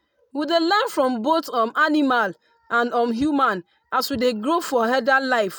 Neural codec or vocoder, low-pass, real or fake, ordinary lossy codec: none; none; real; none